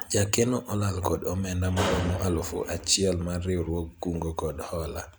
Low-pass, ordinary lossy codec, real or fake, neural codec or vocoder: none; none; real; none